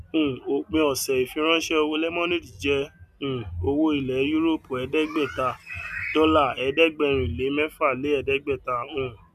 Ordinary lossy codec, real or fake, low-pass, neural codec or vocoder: none; real; 14.4 kHz; none